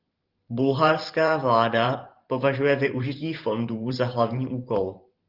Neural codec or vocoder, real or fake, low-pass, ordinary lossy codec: vocoder, 44.1 kHz, 128 mel bands every 512 samples, BigVGAN v2; fake; 5.4 kHz; Opus, 32 kbps